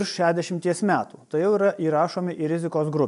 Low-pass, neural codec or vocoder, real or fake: 10.8 kHz; none; real